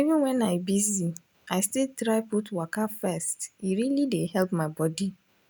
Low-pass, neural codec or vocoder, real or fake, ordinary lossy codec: none; none; real; none